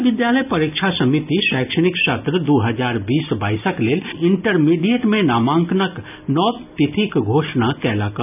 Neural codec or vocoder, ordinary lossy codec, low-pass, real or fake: none; none; 3.6 kHz; real